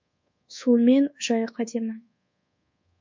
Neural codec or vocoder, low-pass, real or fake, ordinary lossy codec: codec, 24 kHz, 1.2 kbps, DualCodec; 7.2 kHz; fake; MP3, 64 kbps